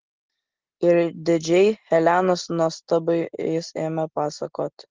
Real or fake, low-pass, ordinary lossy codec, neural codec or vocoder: real; 7.2 kHz; Opus, 16 kbps; none